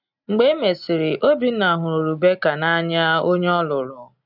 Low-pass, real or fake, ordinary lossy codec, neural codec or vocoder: 5.4 kHz; real; none; none